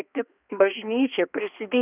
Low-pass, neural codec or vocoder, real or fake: 3.6 kHz; codec, 16 kHz, 2 kbps, X-Codec, HuBERT features, trained on balanced general audio; fake